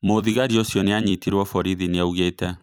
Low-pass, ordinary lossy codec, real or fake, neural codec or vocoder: none; none; fake; vocoder, 44.1 kHz, 128 mel bands every 256 samples, BigVGAN v2